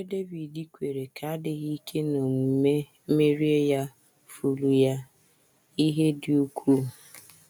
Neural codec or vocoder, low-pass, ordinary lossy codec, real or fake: none; none; none; real